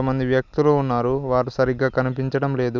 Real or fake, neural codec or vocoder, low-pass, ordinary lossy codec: real; none; 7.2 kHz; none